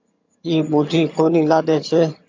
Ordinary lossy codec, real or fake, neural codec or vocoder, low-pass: AAC, 48 kbps; fake; vocoder, 22.05 kHz, 80 mel bands, HiFi-GAN; 7.2 kHz